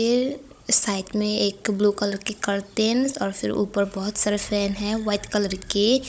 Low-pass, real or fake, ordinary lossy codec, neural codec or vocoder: none; fake; none; codec, 16 kHz, 16 kbps, FunCodec, trained on Chinese and English, 50 frames a second